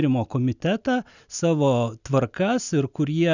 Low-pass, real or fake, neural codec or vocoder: 7.2 kHz; real; none